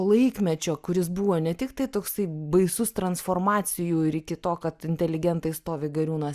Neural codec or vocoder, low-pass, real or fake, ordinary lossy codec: none; 14.4 kHz; real; Opus, 64 kbps